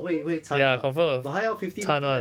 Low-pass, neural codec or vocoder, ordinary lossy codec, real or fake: 19.8 kHz; codec, 44.1 kHz, 7.8 kbps, DAC; none; fake